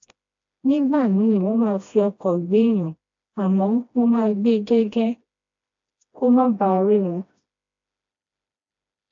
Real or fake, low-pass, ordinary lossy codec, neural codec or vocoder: fake; 7.2 kHz; AAC, 48 kbps; codec, 16 kHz, 1 kbps, FreqCodec, smaller model